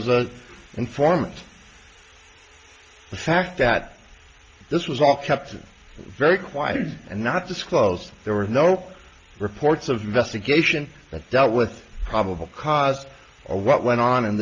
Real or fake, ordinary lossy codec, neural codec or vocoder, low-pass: real; Opus, 24 kbps; none; 7.2 kHz